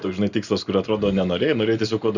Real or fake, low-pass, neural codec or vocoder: real; 7.2 kHz; none